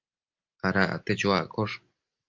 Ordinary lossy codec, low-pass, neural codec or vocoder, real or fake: Opus, 24 kbps; 7.2 kHz; none; real